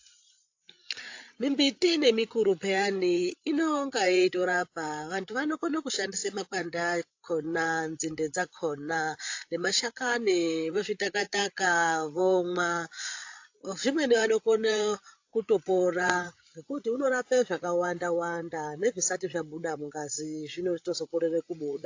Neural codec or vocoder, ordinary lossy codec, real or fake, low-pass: codec, 16 kHz, 8 kbps, FreqCodec, larger model; AAC, 48 kbps; fake; 7.2 kHz